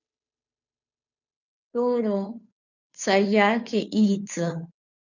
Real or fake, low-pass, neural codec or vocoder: fake; 7.2 kHz; codec, 16 kHz, 2 kbps, FunCodec, trained on Chinese and English, 25 frames a second